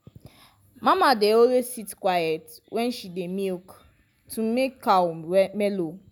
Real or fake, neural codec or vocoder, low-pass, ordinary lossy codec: real; none; none; none